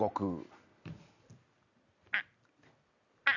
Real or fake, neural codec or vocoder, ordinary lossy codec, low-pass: real; none; none; 7.2 kHz